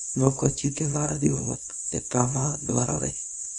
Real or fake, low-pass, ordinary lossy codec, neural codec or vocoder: fake; 10.8 kHz; none; codec, 24 kHz, 0.9 kbps, WavTokenizer, small release